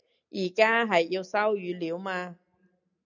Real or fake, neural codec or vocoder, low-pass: real; none; 7.2 kHz